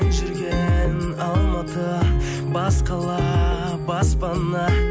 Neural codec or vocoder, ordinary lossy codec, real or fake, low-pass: none; none; real; none